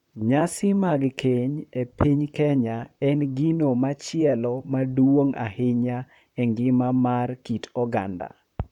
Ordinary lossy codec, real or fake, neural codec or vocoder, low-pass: none; fake; vocoder, 44.1 kHz, 128 mel bands, Pupu-Vocoder; 19.8 kHz